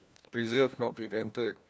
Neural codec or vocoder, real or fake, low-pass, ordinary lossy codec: codec, 16 kHz, 1 kbps, FunCodec, trained on LibriTTS, 50 frames a second; fake; none; none